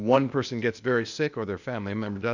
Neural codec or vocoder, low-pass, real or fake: codec, 16 kHz, 0.8 kbps, ZipCodec; 7.2 kHz; fake